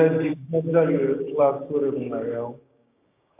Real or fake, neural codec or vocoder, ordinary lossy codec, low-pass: fake; codec, 16 kHz, 6 kbps, DAC; AAC, 32 kbps; 3.6 kHz